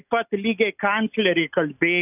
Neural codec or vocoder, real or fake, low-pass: none; real; 3.6 kHz